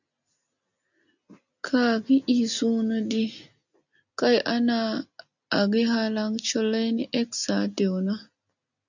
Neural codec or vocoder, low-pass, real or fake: none; 7.2 kHz; real